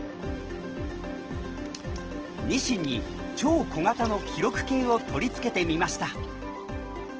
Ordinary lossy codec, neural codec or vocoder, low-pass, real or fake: Opus, 16 kbps; none; 7.2 kHz; real